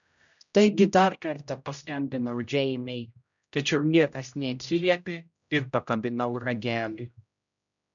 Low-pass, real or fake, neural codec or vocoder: 7.2 kHz; fake; codec, 16 kHz, 0.5 kbps, X-Codec, HuBERT features, trained on general audio